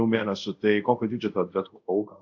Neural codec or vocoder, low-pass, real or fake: codec, 24 kHz, 0.5 kbps, DualCodec; 7.2 kHz; fake